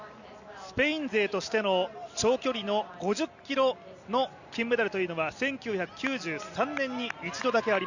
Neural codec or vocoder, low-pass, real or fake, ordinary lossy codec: none; 7.2 kHz; real; Opus, 64 kbps